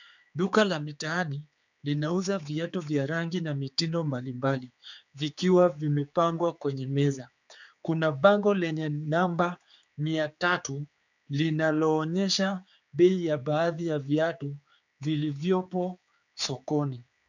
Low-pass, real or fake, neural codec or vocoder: 7.2 kHz; fake; codec, 16 kHz, 4 kbps, X-Codec, HuBERT features, trained on general audio